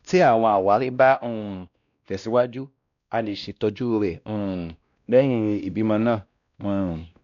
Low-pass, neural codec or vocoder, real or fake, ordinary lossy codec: 7.2 kHz; codec, 16 kHz, 1 kbps, X-Codec, WavLM features, trained on Multilingual LibriSpeech; fake; none